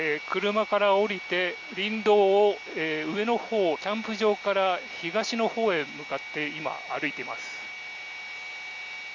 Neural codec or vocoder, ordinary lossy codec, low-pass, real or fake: none; Opus, 64 kbps; 7.2 kHz; real